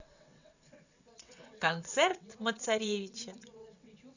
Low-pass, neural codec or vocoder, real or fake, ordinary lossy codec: 7.2 kHz; vocoder, 22.05 kHz, 80 mel bands, WaveNeXt; fake; none